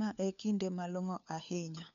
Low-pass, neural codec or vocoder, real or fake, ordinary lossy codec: 7.2 kHz; codec, 16 kHz, 4 kbps, FunCodec, trained on LibriTTS, 50 frames a second; fake; none